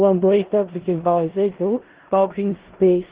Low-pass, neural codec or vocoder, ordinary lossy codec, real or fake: 3.6 kHz; codec, 16 kHz in and 24 kHz out, 0.4 kbps, LongCat-Audio-Codec, four codebook decoder; Opus, 16 kbps; fake